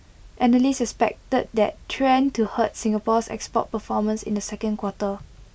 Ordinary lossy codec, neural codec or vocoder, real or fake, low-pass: none; none; real; none